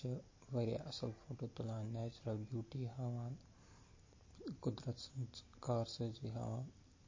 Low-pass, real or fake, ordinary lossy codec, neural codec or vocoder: 7.2 kHz; real; MP3, 32 kbps; none